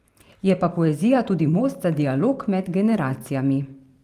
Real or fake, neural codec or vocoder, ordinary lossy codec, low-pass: fake; vocoder, 44.1 kHz, 128 mel bands every 512 samples, BigVGAN v2; Opus, 32 kbps; 14.4 kHz